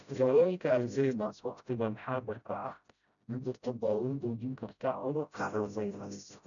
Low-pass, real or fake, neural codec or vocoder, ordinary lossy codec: 7.2 kHz; fake; codec, 16 kHz, 0.5 kbps, FreqCodec, smaller model; none